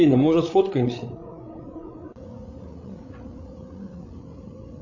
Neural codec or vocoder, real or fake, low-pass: codec, 16 kHz, 8 kbps, FreqCodec, larger model; fake; 7.2 kHz